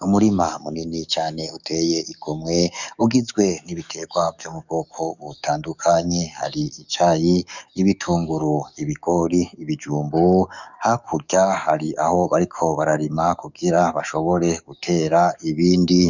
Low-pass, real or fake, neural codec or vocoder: 7.2 kHz; fake; codec, 44.1 kHz, 7.8 kbps, DAC